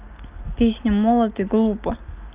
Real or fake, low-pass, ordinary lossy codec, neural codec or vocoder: real; 3.6 kHz; Opus, 24 kbps; none